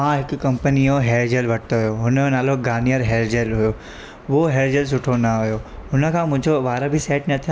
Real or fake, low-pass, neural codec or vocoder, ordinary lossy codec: real; none; none; none